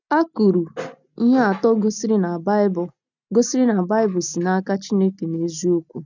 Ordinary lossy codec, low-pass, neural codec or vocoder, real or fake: none; 7.2 kHz; none; real